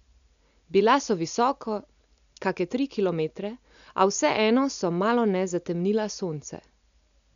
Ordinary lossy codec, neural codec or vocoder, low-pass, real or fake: none; none; 7.2 kHz; real